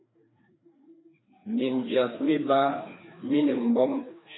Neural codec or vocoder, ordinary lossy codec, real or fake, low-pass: codec, 16 kHz, 2 kbps, FreqCodec, larger model; AAC, 16 kbps; fake; 7.2 kHz